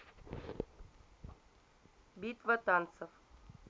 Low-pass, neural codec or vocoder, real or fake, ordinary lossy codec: none; none; real; none